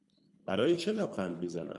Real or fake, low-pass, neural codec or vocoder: fake; 10.8 kHz; codec, 24 kHz, 3 kbps, HILCodec